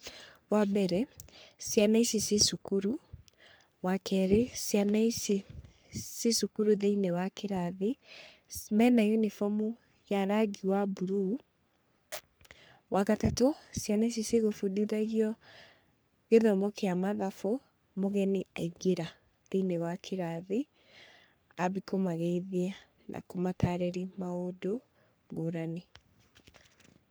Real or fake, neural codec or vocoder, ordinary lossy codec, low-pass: fake; codec, 44.1 kHz, 3.4 kbps, Pupu-Codec; none; none